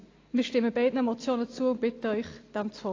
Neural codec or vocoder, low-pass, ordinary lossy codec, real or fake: none; 7.2 kHz; AAC, 32 kbps; real